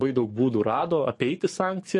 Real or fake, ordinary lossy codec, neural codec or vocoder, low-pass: fake; MP3, 48 kbps; codec, 44.1 kHz, 7.8 kbps, DAC; 10.8 kHz